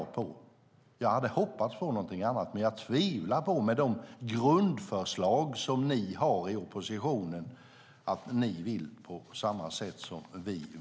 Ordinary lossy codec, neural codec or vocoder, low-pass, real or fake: none; none; none; real